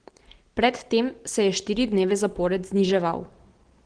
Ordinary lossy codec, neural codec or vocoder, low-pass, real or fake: Opus, 16 kbps; none; 9.9 kHz; real